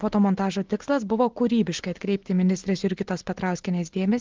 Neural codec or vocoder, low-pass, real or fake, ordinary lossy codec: none; 7.2 kHz; real; Opus, 16 kbps